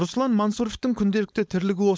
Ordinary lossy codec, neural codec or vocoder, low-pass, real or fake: none; codec, 16 kHz, 4.8 kbps, FACodec; none; fake